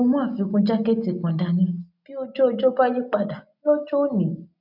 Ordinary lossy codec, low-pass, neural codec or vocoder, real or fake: none; 5.4 kHz; none; real